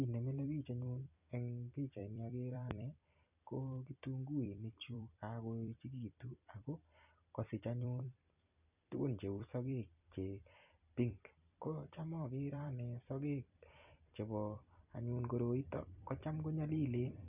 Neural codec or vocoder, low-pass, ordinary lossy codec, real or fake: vocoder, 44.1 kHz, 128 mel bands every 256 samples, BigVGAN v2; 3.6 kHz; none; fake